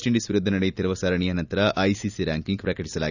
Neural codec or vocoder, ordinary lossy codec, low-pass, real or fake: none; none; 7.2 kHz; real